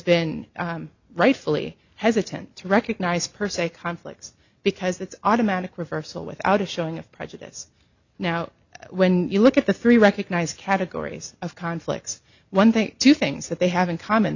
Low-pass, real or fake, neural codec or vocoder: 7.2 kHz; real; none